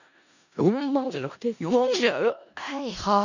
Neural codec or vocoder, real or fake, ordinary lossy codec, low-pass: codec, 16 kHz in and 24 kHz out, 0.4 kbps, LongCat-Audio-Codec, four codebook decoder; fake; none; 7.2 kHz